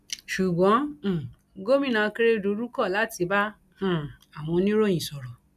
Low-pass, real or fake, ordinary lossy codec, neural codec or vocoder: 14.4 kHz; real; none; none